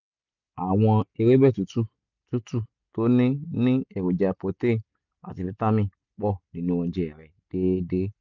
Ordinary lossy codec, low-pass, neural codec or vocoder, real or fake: none; 7.2 kHz; none; real